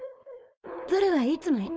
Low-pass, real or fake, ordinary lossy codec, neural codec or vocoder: none; fake; none; codec, 16 kHz, 4.8 kbps, FACodec